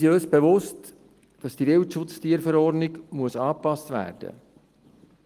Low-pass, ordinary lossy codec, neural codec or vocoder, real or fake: 14.4 kHz; Opus, 24 kbps; none; real